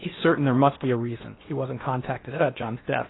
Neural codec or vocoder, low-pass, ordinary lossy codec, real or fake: codec, 16 kHz in and 24 kHz out, 0.8 kbps, FocalCodec, streaming, 65536 codes; 7.2 kHz; AAC, 16 kbps; fake